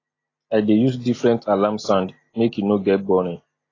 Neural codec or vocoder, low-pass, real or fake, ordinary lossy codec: none; 7.2 kHz; real; AAC, 32 kbps